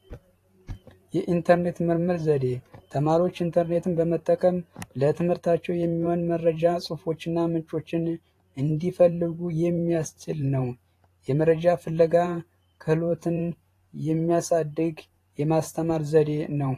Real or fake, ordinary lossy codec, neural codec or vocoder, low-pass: fake; AAC, 48 kbps; vocoder, 44.1 kHz, 128 mel bands every 512 samples, BigVGAN v2; 14.4 kHz